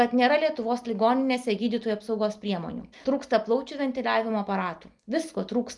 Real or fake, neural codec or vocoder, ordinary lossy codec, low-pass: real; none; Opus, 32 kbps; 10.8 kHz